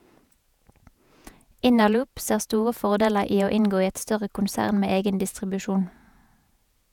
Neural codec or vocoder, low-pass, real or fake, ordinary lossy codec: vocoder, 48 kHz, 128 mel bands, Vocos; 19.8 kHz; fake; none